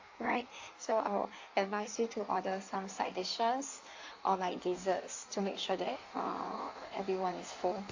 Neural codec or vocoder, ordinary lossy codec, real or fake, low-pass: codec, 16 kHz in and 24 kHz out, 1.1 kbps, FireRedTTS-2 codec; AAC, 48 kbps; fake; 7.2 kHz